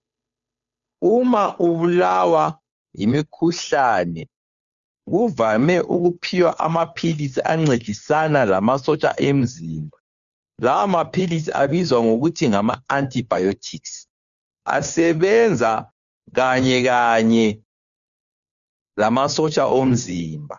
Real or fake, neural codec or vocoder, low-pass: fake; codec, 16 kHz, 2 kbps, FunCodec, trained on Chinese and English, 25 frames a second; 7.2 kHz